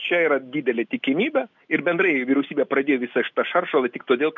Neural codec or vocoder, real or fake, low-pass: none; real; 7.2 kHz